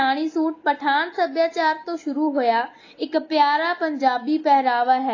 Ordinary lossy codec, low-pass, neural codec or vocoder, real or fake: AAC, 32 kbps; 7.2 kHz; none; real